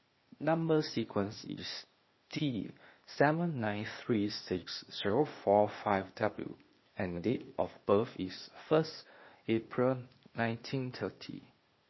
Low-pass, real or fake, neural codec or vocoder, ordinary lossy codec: 7.2 kHz; fake; codec, 16 kHz, 0.8 kbps, ZipCodec; MP3, 24 kbps